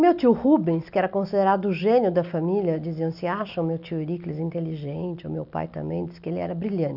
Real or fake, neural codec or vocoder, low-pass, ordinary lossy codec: real; none; 5.4 kHz; none